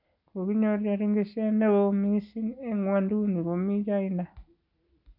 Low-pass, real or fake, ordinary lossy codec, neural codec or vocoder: 5.4 kHz; real; none; none